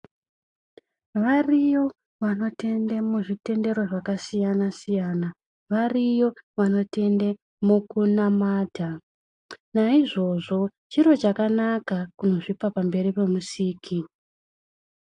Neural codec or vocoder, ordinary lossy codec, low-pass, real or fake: none; AAC, 64 kbps; 10.8 kHz; real